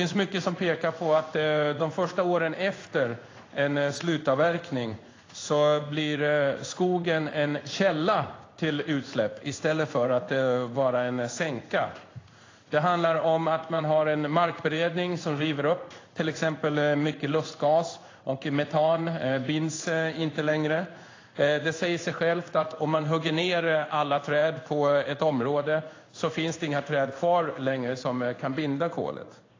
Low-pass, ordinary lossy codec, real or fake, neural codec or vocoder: 7.2 kHz; AAC, 32 kbps; fake; codec, 16 kHz in and 24 kHz out, 1 kbps, XY-Tokenizer